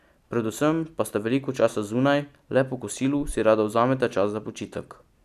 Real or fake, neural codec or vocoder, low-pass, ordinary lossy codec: real; none; 14.4 kHz; none